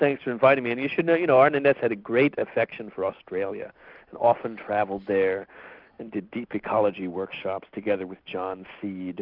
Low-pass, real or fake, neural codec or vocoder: 5.4 kHz; real; none